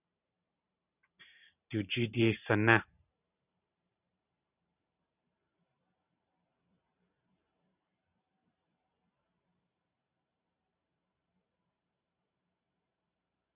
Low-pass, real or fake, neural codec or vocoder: 3.6 kHz; fake; vocoder, 44.1 kHz, 128 mel bands every 512 samples, BigVGAN v2